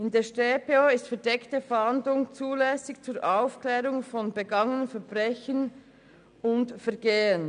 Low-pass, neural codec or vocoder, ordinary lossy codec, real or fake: 9.9 kHz; none; none; real